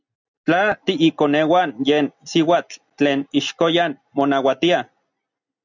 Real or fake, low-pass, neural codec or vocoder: real; 7.2 kHz; none